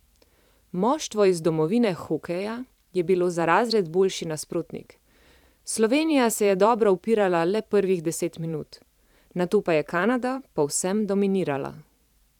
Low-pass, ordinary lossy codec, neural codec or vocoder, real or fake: 19.8 kHz; none; vocoder, 44.1 kHz, 128 mel bands every 512 samples, BigVGAN v2; fake